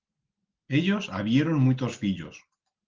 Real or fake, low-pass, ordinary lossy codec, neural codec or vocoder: real; 7.2 kHz; Opus, 16 kbps; none